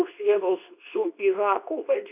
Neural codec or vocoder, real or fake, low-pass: codec, 24 kHz, 0.9 kbps, WavTokenizer, medium speech release version 2; fake; 3.6 kHz